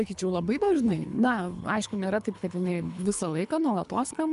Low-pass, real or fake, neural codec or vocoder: 10.8 kHz; fake; codec, 24 kHz, 3 kbps, HILCodec